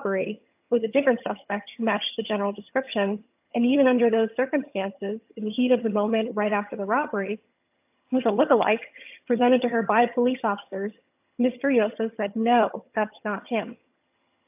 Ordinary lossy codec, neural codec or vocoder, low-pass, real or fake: AAC, 32 kbps; vocoder, 22.05 kHz, 80 mel bands, HiFi-GAN; 3.6 kHz; fake